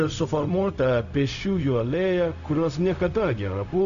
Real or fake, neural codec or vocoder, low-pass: fake; codec, 16 kHz, 0.4 kbps, LongCat-Audio-Codec; 7.2 kHz